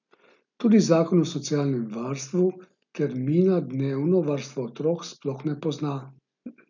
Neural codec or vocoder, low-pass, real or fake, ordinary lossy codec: none; 7.2 kHz; real; none